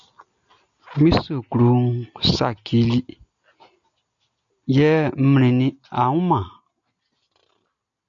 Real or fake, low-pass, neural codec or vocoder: real; 7.2 kHz; none